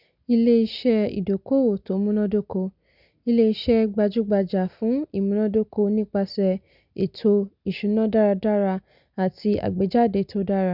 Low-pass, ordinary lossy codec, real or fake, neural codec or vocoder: 5.4 kHz; none; real; none